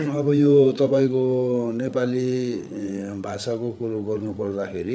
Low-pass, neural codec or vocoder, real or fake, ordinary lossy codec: none; codec, 16 kHz, 8 kbps, FreqCodec, larger model; fake; none